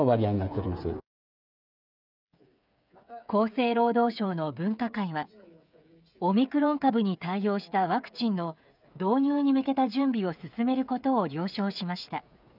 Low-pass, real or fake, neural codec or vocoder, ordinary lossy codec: 5.4 kHz; fake; codec, 16 kHz, 8 kbps, FreqCodec, smaller model; none